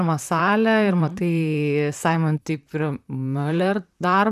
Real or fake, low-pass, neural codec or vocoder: fake; 14.4 kHz; vocoder, 44.1 kHz, 128 mel bands, Pupu-Vocoder